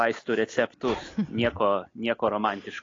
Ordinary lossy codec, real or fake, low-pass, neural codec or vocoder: AAC, 32 kbps; real; 7.2 kHz; none